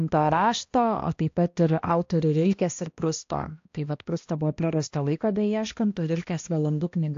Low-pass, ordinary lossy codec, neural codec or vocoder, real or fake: 7.2 kHz; AAC, 48 kbps; codec, 16 kHz, 1 kbps, X-Codec, HuBERT features, trained on balanced general audio; fake